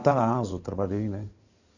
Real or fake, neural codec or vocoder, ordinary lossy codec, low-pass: fake; codec, 16 kHz in and 24 kHz out, 2.2 kbps, FireRedTTS-2 codec; none; 7.2 kHz